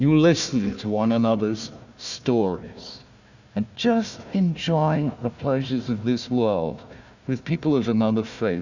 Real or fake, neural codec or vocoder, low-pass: fake; codec, 16 kHz, 1 kbps, FunCodec, trained on Chinese and English, 50 frames a second; 7.2 kHz